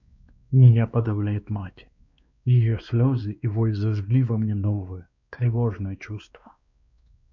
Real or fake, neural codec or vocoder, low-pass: fake; codec, 16 kHz, 2 kbps, X-Codec, WavLM features, trained on Multilingual LibriSpeech; 7.2 kHz